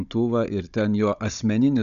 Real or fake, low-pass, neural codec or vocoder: fake; 7.2 kHz; codec, 16 kHz, 16 kbps, FunCodec, trained on Chinese and English, 50 frames a second